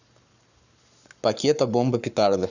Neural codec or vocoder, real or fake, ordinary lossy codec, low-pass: codec, 44.1 kHz, 7.8 kbps, Pupu-Codec; fake; none; 7.2 kHz